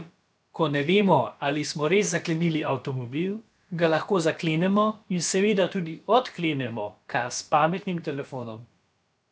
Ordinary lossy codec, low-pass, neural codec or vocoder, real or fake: none; none; codec, 16 kHz, about 1 kbps, DyCAST, with the encoder's durations; fake